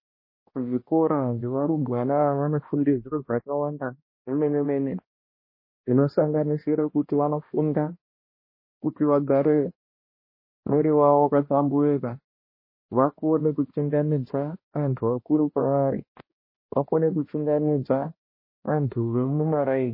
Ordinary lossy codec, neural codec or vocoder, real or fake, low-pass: MP3, 24 kbps; codec, 16 kHz, 1 kbps, X-Codec, HuBERT features, trained on balanced general audio; fake; 5.4 kHz